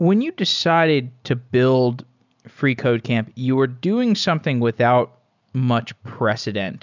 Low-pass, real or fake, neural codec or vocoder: 7.2 kHz; real; none